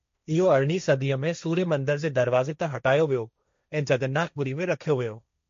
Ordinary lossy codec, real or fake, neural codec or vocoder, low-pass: MP3, 48 kbps; fake; codec, 16 kHz, 1.1 kbps, Voila-Tokenizer; 7.2 kHz